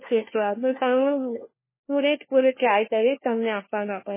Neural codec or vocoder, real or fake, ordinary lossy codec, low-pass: codec, 16 kHz, 1 kbps, FunCodec, trained on LibriTTS, 50 frames a second; fake; MP3, 16 kbps; 3.6 kHz